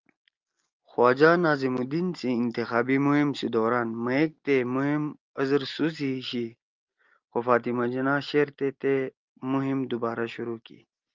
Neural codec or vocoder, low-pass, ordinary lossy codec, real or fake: none; 7.2 kHz; Opus, 24 kbps; real